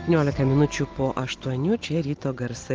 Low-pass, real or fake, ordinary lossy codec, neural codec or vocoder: 7.2 kHz; real; Opus, 16 kbps; none